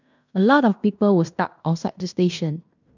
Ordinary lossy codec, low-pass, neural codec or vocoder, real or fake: none; 7.2 kHz; codec, 16 kHz in and 24 kHz out, 0.9 kbps, LongCat-Audio-Codec, fine tuned four codebook decoder; fake